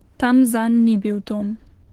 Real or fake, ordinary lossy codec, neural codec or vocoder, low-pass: fake; Opus, 16 kbps; autoencoder, 48 kHz, 32 numbers a frame, DAC-VAE, trained on Japanese speech; 19.8 kHz